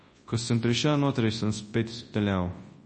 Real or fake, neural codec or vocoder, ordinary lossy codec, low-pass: fake; codec, 24 kHz, 0.9 kbps, WavTokenizer, large speech release; MP3, 32 kbps; 10.8 kHz